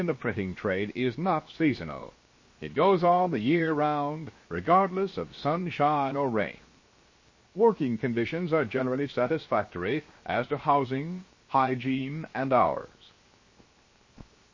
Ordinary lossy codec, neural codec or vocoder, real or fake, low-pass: MP3, 32 kbps; codec, 16 kHz, 0.7 kbps, FocalCodec; fake; 7.2 kHz